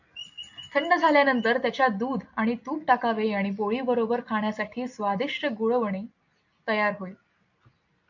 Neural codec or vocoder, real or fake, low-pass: none; real; 7.2 kHz